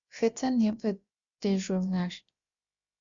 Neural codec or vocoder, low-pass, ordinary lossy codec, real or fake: codec, 16 kHz, about 1 kbps, DyCAST, with the encoder's durations; 7.2 kHz; Opus, 64 kbps; fake